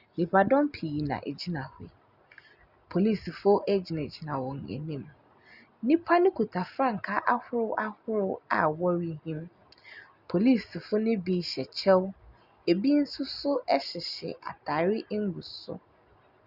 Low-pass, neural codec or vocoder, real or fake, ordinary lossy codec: 5.4 kHz; none; real; Opus, 64 kbps